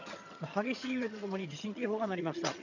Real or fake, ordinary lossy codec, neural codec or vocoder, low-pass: fake; none; vocoder, 22.05 kHz, 80 mel bands, HiFi-GAN; 7.2 kHz